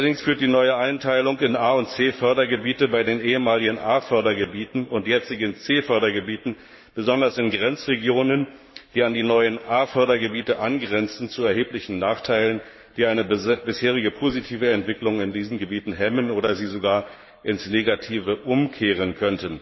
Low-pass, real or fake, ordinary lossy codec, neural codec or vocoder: 7.2 kHz; fake; MP3, 24 kbps; codec, 44.1 kHz, 7.8 kbps, Pupu-Codec